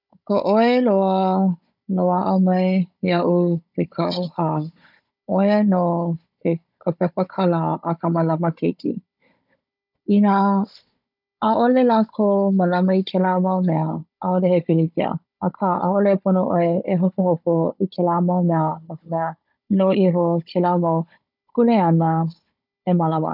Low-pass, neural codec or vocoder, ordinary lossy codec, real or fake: 5.4 kHz; codec, 16 kHz, 16 kbps, FunCodec, trained on Chinese and English, 50 frames a second; none; fake